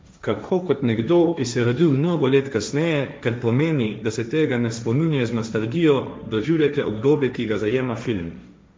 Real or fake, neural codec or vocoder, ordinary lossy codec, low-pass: fake; codec, 16 kHz, 1.1 kbps, Voila-Tokenizer; none; none